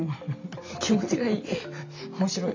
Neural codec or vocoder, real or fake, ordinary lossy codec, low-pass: none; real; MP3, 48 kbps; 7.2 kHz